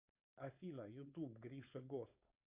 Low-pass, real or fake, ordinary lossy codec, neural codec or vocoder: 3.6 kHz; fake; Opus, 64 kbps; codec, 16 kHz, 4.8 kbps, FACodec